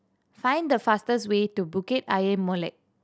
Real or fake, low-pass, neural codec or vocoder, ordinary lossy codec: real; none; none; none